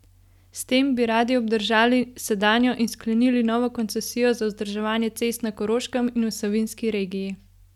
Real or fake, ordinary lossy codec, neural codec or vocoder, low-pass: real; none; none; 19.8 kHz